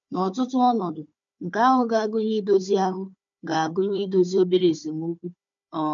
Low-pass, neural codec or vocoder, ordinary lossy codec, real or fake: 7.2 kHz; codec, 16 kHz, 4 kbps, FunCodec, trained on Chinese and English, 50 frames a second; MP3, 64 kbps; fake